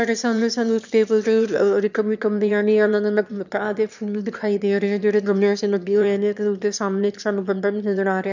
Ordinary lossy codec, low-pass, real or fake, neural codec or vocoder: none; 7.2 kHz; fake; autoencoder, 22.05 kHz, a latent of 192 numbers a frame, VITS, trained on one speaker